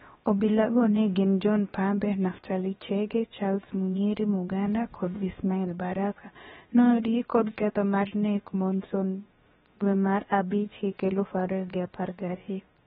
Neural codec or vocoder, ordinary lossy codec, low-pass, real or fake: codec, 16 kHz, about 1 kbps, DyCAST, with the encoder's durations; AAC, 16 kbps; 7.2 kHz; fake